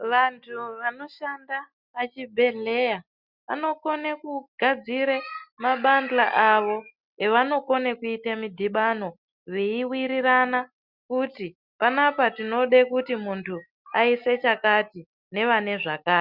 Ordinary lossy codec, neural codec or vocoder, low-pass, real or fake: Opus, 64 kbps; none; 5.4 kHz; real